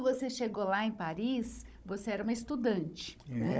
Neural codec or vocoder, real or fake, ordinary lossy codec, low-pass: codec, 16 kHz, 16 kbps, FunCodec, trained on Chinese and English, 50 frames a second; fake; none; none